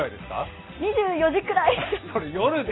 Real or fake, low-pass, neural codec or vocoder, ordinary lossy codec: real; 7.2 kHz; none; AAC, 16 kbps